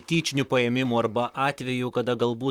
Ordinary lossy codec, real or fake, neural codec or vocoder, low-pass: Opus, 64 kbps; fake; vocoder, 44.1 kHz, 128 mel bands, Pupu-Vocoder; 19.8 kHz